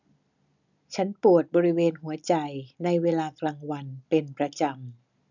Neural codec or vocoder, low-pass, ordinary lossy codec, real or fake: none; 7.2 kHz; none; real